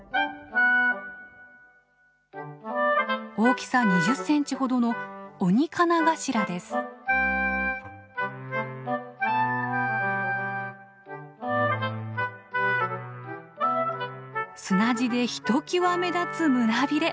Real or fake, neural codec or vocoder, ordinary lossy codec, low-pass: real; none; none; none